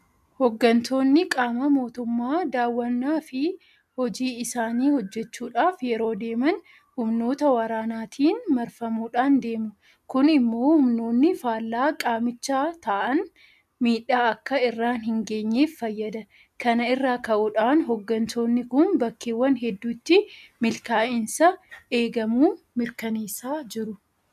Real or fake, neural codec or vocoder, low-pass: real; none; 14.4 kHz